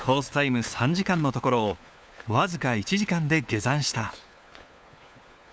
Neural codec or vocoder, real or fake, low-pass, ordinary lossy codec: codec, 16 kHz, 4 kbps, FunCodec, trained on LibriTTS, 50 frames a second; fake; none; none